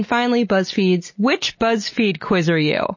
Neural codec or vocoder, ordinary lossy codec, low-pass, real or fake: none; MP3, 32 kbps; 7.2 kHz; real